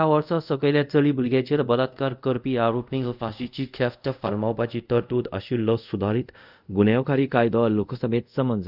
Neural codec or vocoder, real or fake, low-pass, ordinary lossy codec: codec, 24 kHz, 0.5 kbps, DualCodec; fake; 5.4 kHz; none